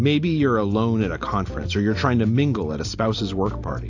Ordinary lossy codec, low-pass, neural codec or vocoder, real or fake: AAC, 48 kbps; 7.2 kHz; none; real